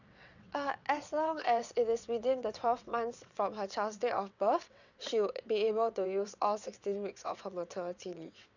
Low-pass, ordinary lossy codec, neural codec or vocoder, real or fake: 7.2 kHz; none; vocoder, 22.05 kHz, 80 mel bands, WaveNeXt; fake